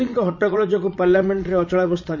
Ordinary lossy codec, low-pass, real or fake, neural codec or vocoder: none; 7.2 kHz; fake; vocoder, 44.1 kHz, 80 mel bands, Vocos